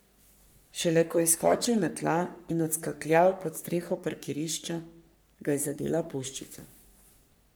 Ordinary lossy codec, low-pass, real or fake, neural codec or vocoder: none; none; fake; codec, 44.1 kHz, 3.4 kbps, Pupu-Codec